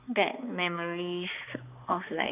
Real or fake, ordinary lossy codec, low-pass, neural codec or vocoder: fake; none; 3.6 kHz; codec, 16 kHz, 2 kbps, X-Codec, HuBERT features, trained on balanced general audio